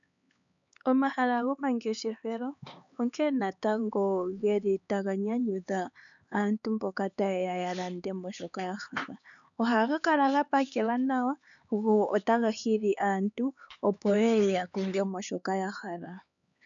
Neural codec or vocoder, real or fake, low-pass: codec, 16 kHz, 4 kbps, X-Codec, HuBERT features, trained on LibriSpeech; fake; 7.2 kHz